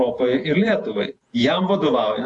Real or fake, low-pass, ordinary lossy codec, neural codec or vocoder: fake; 10.8 kHz; AAC, 48 kbps; vocoder, 48 kHz, 128 mel bands, Vocos